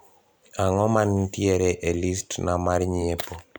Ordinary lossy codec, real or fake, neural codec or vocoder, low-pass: none; fake; vocoder, 44.1 kHz, 128 mel bands every 256 samples, BigVGAN v2; none